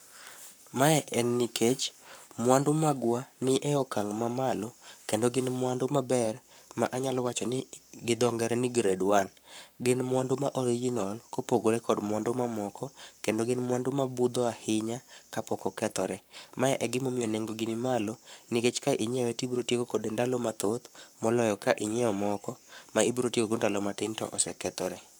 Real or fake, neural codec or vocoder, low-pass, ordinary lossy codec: fake; codec, 44.1 kHz, 7.8 kbps, Pupu-Codec; none; none